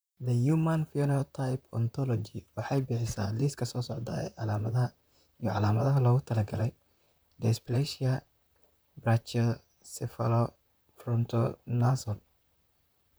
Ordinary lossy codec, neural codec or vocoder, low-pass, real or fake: none; vocoder, 44.1 kHz, 128 mel bands, Pupu-Vocoder; none; fake